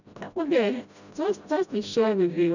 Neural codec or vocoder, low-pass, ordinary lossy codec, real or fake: codec, 16 kHz, 0.5 kbps, FreqCodec, smaller model; 7.2 kHz; none; fake